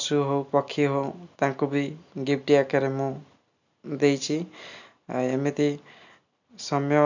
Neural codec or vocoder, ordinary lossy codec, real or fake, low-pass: none; none; real; 7.2 kHz